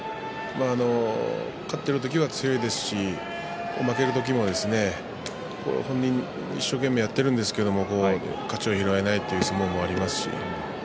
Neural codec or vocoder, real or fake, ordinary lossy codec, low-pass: none; real; none; none